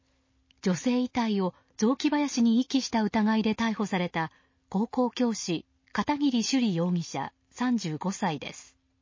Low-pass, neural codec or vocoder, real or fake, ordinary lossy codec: 7.2 kHz; none; real; MP3, 32 kbps